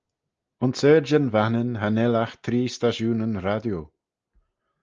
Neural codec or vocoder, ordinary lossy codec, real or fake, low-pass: none; Opus, 24 kbps; real; 7.2 kHz